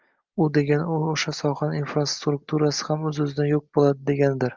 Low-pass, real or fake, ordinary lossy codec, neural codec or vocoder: 7.2 kHz; real; Opus, 24 kbps; none